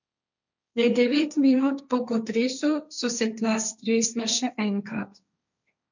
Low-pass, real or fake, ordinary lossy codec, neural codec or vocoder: 7.2 kHz; fake; none; codec, 16 kHz, 1.1 kbps, Voila-Tokenizer